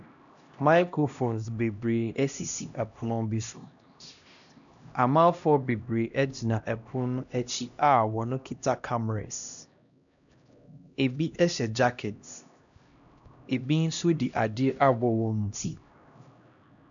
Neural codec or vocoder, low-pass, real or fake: codec, 16 kHz, 1 kbps, X-Codec, HuBERT features, trained on LibriSpeech; 7.2 kHz; fake